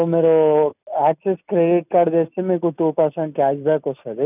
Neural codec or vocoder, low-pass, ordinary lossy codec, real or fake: none; 3.6 kHz; none; real